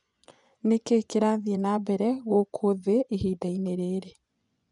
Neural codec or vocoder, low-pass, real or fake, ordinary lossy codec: vocoder, 24 kHz, 100 mel bands, Vocos; 10.8 kHz; fake; none